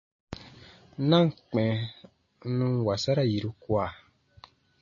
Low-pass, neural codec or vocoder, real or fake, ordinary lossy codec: 7.2 kHz; none; real; MP3, 32 kbps